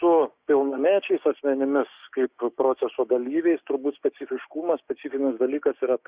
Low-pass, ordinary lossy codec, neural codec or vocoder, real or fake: 3.6 kHz; Opus, 64 kbps; codec, 16 kHz, 6 kbps, DAC; fake